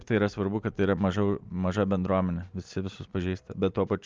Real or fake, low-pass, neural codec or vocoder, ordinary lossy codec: real; 7.2 kHz; none; Opus, 24 kbps